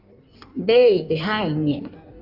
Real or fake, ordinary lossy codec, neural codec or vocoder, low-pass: fake; Opus, 64 kbps; codec, 16 kHz in and 24 kHz out, 1.1 kbps, FireRedTTS-2 codec; 5.4 kHz